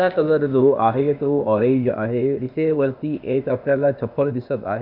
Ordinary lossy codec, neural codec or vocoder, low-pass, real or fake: none; codec, 16 kHz, 0.8 kbps, ZipCodec; 5.4 kHz; fake